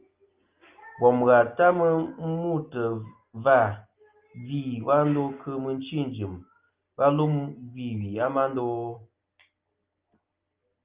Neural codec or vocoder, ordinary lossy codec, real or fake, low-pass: none; Opus, 24 kbps; real; 3.6 kHz